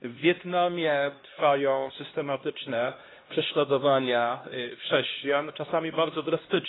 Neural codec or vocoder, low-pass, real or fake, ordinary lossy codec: codec, 16 kHz, 1 kbps, X-Codec, HuBERT features, trained on LibriSpeech; 7.2 kHz; fake; AAC, 16 kbps